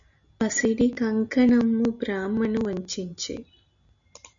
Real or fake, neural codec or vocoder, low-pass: real; none; 7.2 kHz